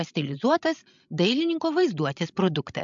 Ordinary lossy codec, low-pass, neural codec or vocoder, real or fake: MP3, 64 kbps; 7.2 kHz; codec, 16 kHz, 16 kbps, FreqCodec, larger model; fake